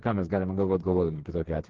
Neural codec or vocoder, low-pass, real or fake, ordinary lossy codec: codec, 16 kHz, 4 kbps, FreqCodec, smaller model; 7.2 kHz; fake; Opus, 16 kbps